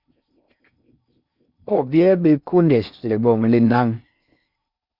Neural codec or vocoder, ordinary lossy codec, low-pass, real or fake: codec, 16 kHz in and 24 kHz out, 0.6 kbps, FocalCodec, streaming, 4096 codes; Opus, 64 kbps; 5.4 kHz; fake